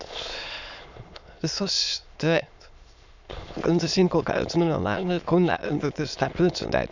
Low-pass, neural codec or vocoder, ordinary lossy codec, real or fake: 7.2 kHz; autoencoder, 22.05 kHz, a latent of 192 numbers a frame, VITS, trained on many speakers; none; fake